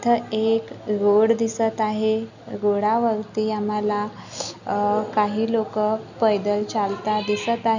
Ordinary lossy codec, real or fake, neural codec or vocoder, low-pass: none; real; none; 7.2 kHz